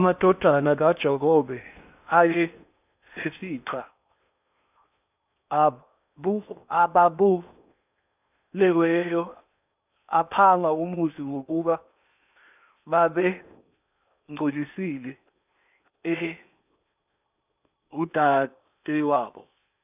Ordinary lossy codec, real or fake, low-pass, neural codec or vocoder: none; fake; 3.6 kHz; codec, 16 kHz in and 24 kHz out, 0.8 kbps, FocalCodec, streaming, 65536 codes